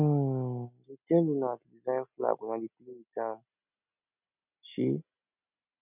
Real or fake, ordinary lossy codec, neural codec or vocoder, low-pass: real; none; none; 3.6 kHz